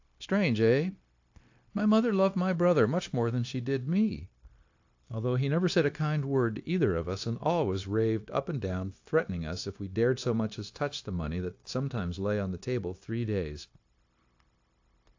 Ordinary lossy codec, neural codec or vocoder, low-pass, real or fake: AAC, 48 kbps; codec, 16 kHz, 0.9 kbps, LongCat-Audio-Codec; 7.2 kHz; fake